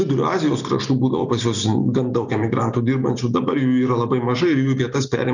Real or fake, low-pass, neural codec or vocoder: real; 7.2 kHz; none